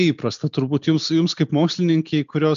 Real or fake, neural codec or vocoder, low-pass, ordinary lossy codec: real; none; 7.2 kHz; MP3, 64 kbps